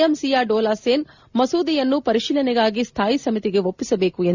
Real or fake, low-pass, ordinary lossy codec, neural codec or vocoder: real; 7.2 kHz; Opus, 64 kbps; none